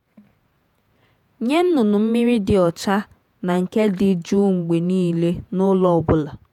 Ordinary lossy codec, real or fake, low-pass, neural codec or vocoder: none; fake; 19.8 kHz; vocoder, 48 kHz, 128 mel bands, Vocos